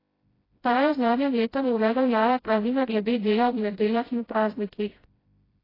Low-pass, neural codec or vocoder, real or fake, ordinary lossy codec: 5.4 kHz; codec, 16 kHz, 0.5 kbps, FreqCodec, smaller model; fake; AAC, 24 kbps